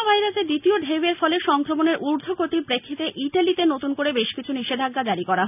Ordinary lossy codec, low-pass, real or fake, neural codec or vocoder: none; 3.6 kHz; real; none